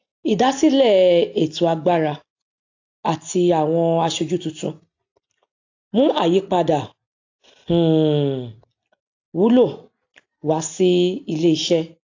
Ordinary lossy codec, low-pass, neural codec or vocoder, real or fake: AAC, 48 kbps; 7.2 kHz; none; real